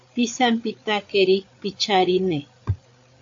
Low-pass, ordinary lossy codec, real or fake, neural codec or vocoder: 7.2 kHz; AAC, 48 kbps; fake; codec, 16 kHz, 8 kbps, FreqCodec, larger model